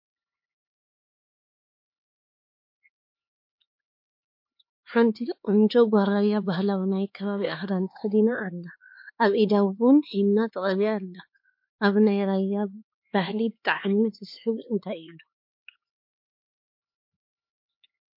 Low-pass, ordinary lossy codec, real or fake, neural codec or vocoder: 5.4 kHz; MP3, 32 kbps; fake; codec, 16 kHz, 4 kbps, X-Codec, HuBERT features, trained on LibriSpeech